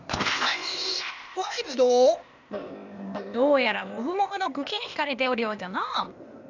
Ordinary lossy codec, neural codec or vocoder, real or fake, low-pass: none; codec, 16 kHz, 0.8 kbps, ZipCodec; fake; 7.2 kHz